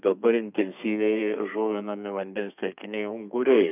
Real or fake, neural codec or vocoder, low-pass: fake; codec, 32 kHz, 1.9 kbps, SNAC; 3.6 kHz